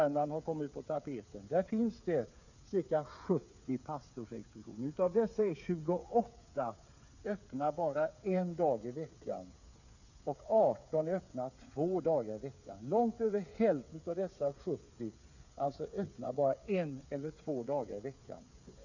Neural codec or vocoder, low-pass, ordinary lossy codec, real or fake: codec, 16 kHz, 8 kbps, FreqCodec, smaller model; 7.2 kHz; none; fake